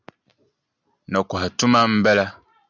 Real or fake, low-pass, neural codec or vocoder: real; 7.2 kHz; none